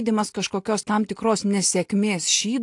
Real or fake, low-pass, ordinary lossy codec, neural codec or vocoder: real; 10.8 kHz; AAC, 64 kbps; none